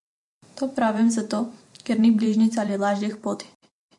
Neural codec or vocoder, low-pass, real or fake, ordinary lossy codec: vocoder, 44.1 kHz, 128 mel bands every 512 samples, BigVGAN v2; 10.8 kHz; fake; MP3, 48 kbps